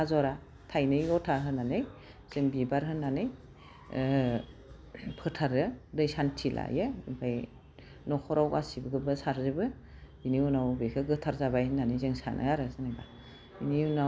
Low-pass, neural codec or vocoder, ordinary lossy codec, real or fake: none; none; none; real